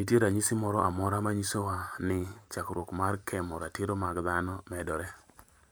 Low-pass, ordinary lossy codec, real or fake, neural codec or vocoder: none; none; fake; vocoder, 44.1 kHz, 128 mel bands every 512 samples, BigVGAN v2